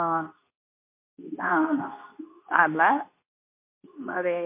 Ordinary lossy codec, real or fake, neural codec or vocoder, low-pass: MP3, 24 kbps; fake; codec, 24 kHz, 0.9 kbps, WavTokenizer, medium speech release version 2; 3.6 kHz